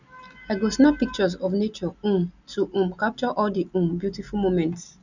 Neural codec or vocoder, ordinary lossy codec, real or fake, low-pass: none; none; real; 7.2 kHz